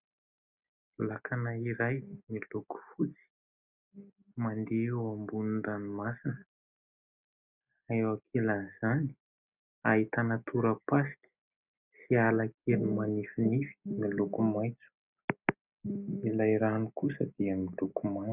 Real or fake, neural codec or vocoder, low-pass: real; none; 3.6 kHz